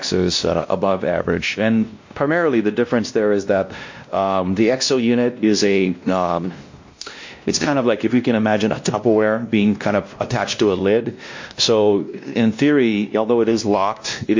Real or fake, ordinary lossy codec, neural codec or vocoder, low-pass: fake; MP3, 48 kbps; codec, 16 kHz, 1 kbps, X-Codec, WavLM features, trained on Multilingual LibriSpeech; 7.2 kHz